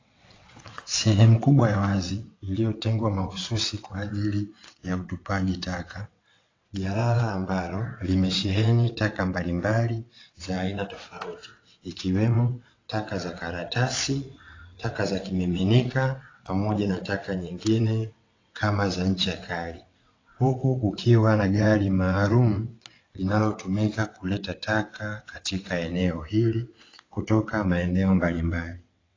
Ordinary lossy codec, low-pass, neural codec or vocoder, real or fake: AAC, 32 kbps; 7.2 kHz; vocoder, 22.05 kHz, 80 mel bands, WaveNeXt; fake